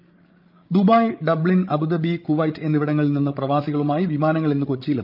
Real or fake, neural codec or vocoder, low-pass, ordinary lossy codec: fake; codec, 16 kHz, 16 kbps, FreqCodec, larger model; 5.4 kHz; Opus, 24 kbps